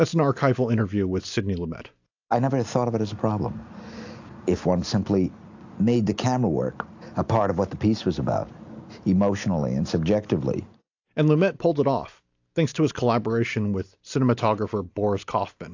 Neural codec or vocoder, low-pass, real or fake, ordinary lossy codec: none; 7.2 kHz; real; AAC, 48 kbps